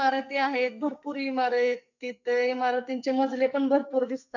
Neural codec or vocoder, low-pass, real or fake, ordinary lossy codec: codec, 44.1 kHz, 2.6 kbps, SNAC; 7.2 kHz; fake; none